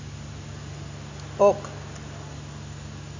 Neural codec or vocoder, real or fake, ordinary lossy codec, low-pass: none; real; AAC, 48 kbps; 7.2 kHz